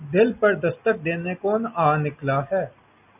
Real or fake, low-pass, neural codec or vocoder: real; 3.6 kHz; none